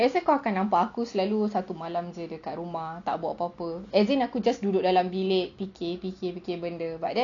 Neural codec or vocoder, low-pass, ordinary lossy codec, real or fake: none; 7.2 kHz; MP3, 96 kbps; real